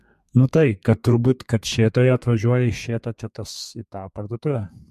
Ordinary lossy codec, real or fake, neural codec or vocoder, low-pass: MP3, 64 kbps; fake; codec, 32 kHz, 1.9 kbps, SNAC; 14.4 kHz